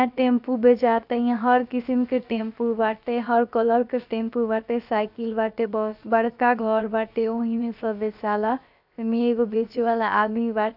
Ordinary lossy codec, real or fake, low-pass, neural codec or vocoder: none; fake; 5.4 kHz; codec, 16 kHz, about 1 kbps, DyCAST, with the encoder's durations